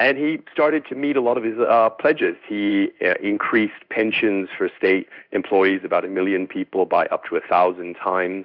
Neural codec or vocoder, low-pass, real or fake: codec, 16 kHz in and 24 kHz out, 1 kbps, XY-Tokenizer; 5.4 kHz; fake